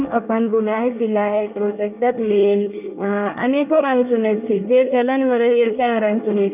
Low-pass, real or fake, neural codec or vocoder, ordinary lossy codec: 3.6 kHz; fake; codec, 24 kHz, 1 kbps, SNAC; none